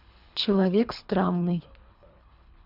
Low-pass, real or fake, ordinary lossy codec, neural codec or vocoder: 5.4 kHz; fake; none; codec, 24 kHz, 3 kbps, HILCodec